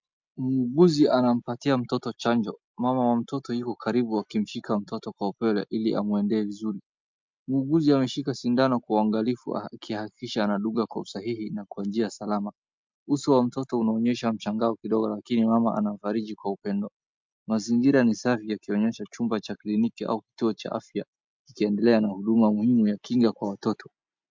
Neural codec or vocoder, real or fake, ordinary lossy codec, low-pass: none; real; MP3, 64 kbps; 7.2 kHz